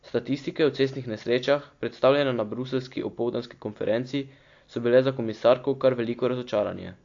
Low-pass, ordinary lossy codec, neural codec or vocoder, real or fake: 7.2 kHz; AAC, 48 kbps; none; real